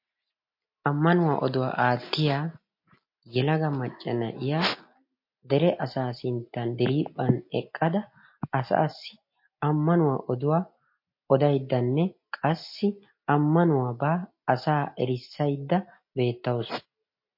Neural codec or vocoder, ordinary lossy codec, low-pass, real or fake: none; MP3, 32 kbps; 5.4 kHz; real